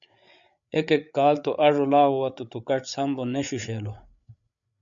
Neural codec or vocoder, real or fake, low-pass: codec, 16 kHz, 8 kbps, FreqCodec, larger model; fake; 7.2 kHz